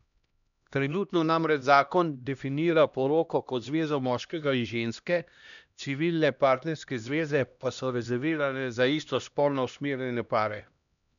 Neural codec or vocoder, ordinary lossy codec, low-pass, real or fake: codec, 16 kHz, 1 kbps, X-Codec, HuBERT features, trained on LibriSpeech; none; 7.2 kHz; fake